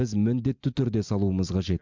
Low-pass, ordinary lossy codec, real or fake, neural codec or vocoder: 7.2 kHz; MP3, 64 kbps; real; none